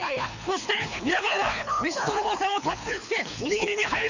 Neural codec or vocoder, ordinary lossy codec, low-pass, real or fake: codec, 24 kHz, 3 kbps, HILCodec; none; 7.2 kHz; fake